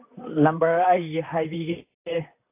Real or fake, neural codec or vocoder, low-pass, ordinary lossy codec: fake; vocoder, 44.1 kHz, 128 mel bands, Pupu-Vocoder; 3.6 kHz; AAC, 24 kbps